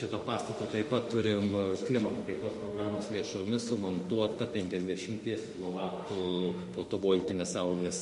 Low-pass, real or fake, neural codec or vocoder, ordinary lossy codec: 14.4 kHz; fake; autoencoder, 48 kHz, 32 numbers a frame, DAC-VAE, trained on Japanese speech; MP3, 48 kbps